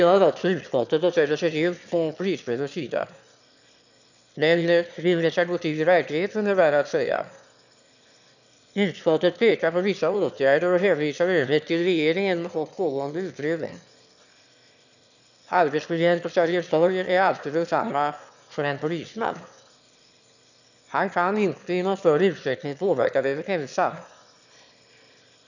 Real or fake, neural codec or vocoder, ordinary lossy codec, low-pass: fake; autoencoder, 22.05 kHz, a latent of 192 numbers a frame, VITS, trained on one speaker; none; 7.2 kHz